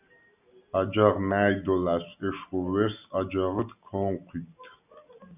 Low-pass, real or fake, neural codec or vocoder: 3.6 kHz; real; none